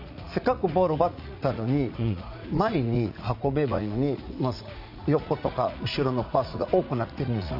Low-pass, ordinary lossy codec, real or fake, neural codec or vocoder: 5.4 kHz; none; fake; vocoder, 44.1 kHz, 80 mel bands, Vocos